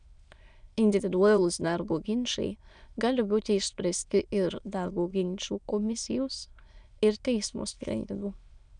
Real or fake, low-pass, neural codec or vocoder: fake; 9.9 kHz; autoencoder, 22.05 kHz, a latent of 192 numbers a frame, VITS, trained on many speakers